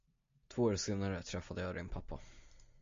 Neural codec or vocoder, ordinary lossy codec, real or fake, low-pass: none; MP3, 48 kbps; real; 7.2 kHz